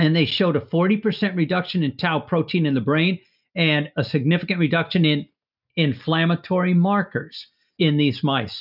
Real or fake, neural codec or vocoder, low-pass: real; none; 5.4 kHz